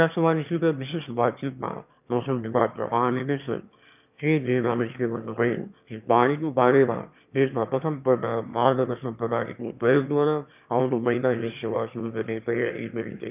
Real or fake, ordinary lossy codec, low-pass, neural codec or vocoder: fake; none; 3.6 kHz; autoencoder, 22.05 kHz, a latent of 192 numbers a frame, VITS, trained on one speaker